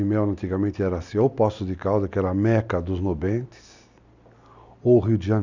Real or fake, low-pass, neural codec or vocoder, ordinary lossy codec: real; 7.2 kHz; none; none